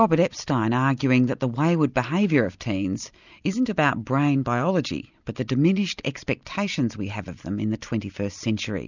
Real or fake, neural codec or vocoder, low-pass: real; none; 7.2 kHz